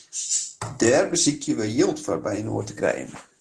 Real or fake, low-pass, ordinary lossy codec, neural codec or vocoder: real; 10.8 kHz; Opus, 16 kbps; none